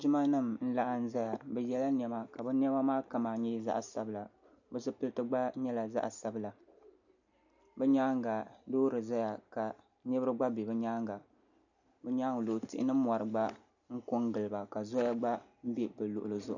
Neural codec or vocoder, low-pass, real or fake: none; 7.2 kHz; real